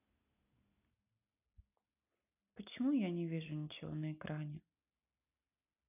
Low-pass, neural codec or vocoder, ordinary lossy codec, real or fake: 3.6 kHz; none; none; real